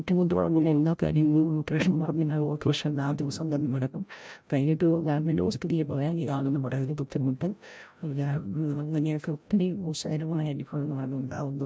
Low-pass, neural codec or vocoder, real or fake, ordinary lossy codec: none; codec, 16 kHz, 0.5 kbps, FreqCodec, larger model; fake; none